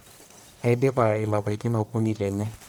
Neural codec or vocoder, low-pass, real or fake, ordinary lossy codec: codec, 44.1 kHz, 1.7 kbps, Pupu-Codec; none; fake; none